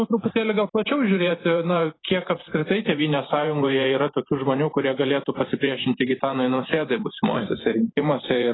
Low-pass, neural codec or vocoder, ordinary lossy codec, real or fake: 7.2 kHz; vocoder, 44.1 kHz, 128 mel bands every 512 samples, BigVGAN v2; AAC, 16 kbps; fake